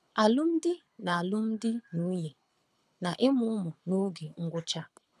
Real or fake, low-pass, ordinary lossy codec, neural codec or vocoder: fake; none; none; codec, 24 kHz, 6 kbps, HILCodec